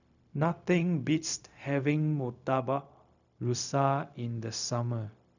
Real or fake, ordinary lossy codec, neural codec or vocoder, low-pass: fake; none; codec, 16 kHz, 0.4 kbps, LongCat-Audio-Codec; 7.2 kHz